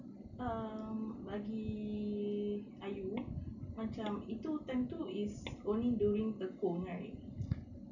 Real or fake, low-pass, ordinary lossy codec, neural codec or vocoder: real; 7.2 kHz; none; none